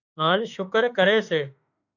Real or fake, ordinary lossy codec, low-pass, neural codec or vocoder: fake; AAC, 48 kbps; 7.2 kHz; autoencoder, 48 kHz, 32 numbers a frame, DAC-VAE, trained on Japanese speech